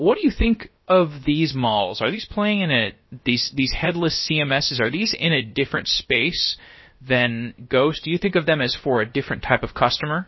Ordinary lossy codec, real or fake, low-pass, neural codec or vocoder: MP3, 24 kbps; fake; 7.2 kHz; codec, 16 kHz, about 1 kbps, DyCAST, with the encoder's durations